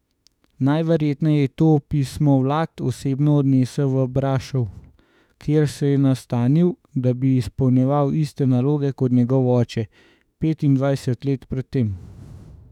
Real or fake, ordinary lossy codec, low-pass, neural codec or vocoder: fake; none; 19.8 kHz; autoencoder, 48 kHz, 32 numbers a frame, DAC-VAE, trained on Japanese speech